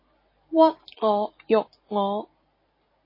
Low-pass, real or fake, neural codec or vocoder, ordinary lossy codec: 5.4 kHz; real; none; MP3, 24 kbps